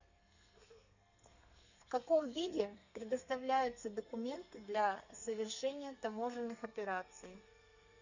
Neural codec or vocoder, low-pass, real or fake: codec, 32 kHz, 1.9 kbps, SNAC; 7.2 kHz; fake